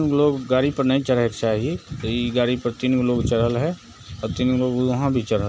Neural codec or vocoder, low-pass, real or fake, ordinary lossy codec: none; none; real; none